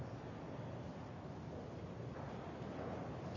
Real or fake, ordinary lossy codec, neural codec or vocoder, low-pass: real; none; none; 7.2 kHz